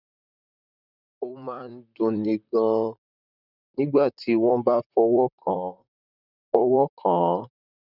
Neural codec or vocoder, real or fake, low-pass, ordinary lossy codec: vocoder, 44.1 kHz, 128 mel bands, Pupu-Vocoder; fake; 5.4 kHz; none